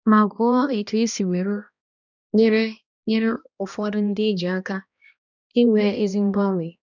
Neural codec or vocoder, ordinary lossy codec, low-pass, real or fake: codec, 16 kHz, 1 kbps, X-Codec, HuBERT features, trained on balanced general audio; none; 7.2 kHz; fake